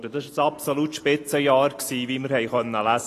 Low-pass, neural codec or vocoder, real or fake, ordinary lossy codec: 14.4 kHz; none; real; AAC, 48 kbps